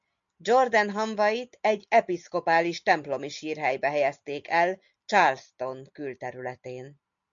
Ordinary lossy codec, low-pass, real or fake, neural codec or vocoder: MP3, 64 kbps; 7.2 kHz; real; none